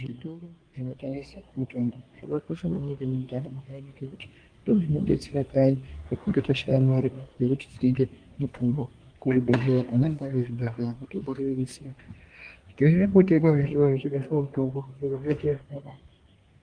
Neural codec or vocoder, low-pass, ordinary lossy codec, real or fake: codec, 24 kHz, 1 kbps, SNAC; 9.9 kHz; Opus, 32 kbps; fake